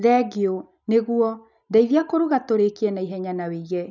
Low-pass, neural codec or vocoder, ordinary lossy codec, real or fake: 7.2 kHz; none; none; real